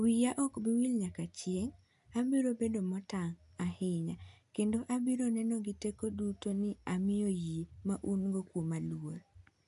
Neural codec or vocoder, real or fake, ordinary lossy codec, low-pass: none; real; none; 10.8 kHz